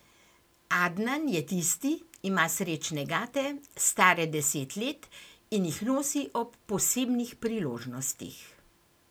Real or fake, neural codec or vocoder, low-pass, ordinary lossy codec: real; none; none; none